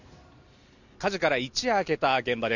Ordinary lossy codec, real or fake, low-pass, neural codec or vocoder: none; real; 7.2 kHz; none